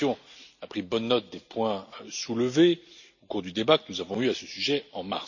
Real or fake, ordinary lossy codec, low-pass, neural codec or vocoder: real; none; 7.2 kHz; none